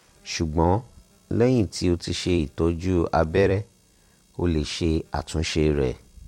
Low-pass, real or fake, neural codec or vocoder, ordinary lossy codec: 19.8 kHz; fake; vocoder, 44.1 kHz, 128 mel bands every 256 samples, BigVGAN v2; MP3, 64 kbps